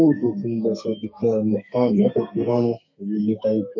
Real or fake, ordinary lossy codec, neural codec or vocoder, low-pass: fake; MP3, 48 kbps; codec, 32 kHz, 1.9 kbps, SNAC; 7.2 kHz